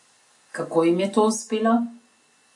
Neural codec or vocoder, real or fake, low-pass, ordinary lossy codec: none; real; 10.8 kHz; MP3, 48 kbps